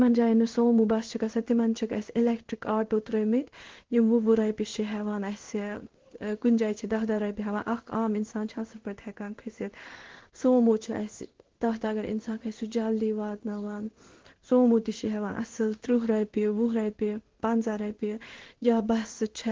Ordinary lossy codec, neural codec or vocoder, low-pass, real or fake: Opus, 16 kbps; codec, 16 kHz in and 24 kHz out, 1 kbps, XY-Tokenizer; 7.2 kHz; fake